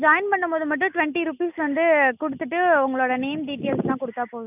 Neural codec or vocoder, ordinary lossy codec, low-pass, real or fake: none; none; 3.6 kHz; real